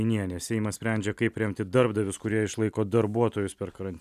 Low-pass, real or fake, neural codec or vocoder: 14.4 kHz; real; none